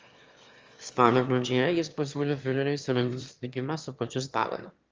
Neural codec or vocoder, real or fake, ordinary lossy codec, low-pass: autoencoder, 22.05 kHz, a latent of 192 numbers a frame, VITS, trained on one speaker; fake; Opus, 32 kbps; 7.2 kHz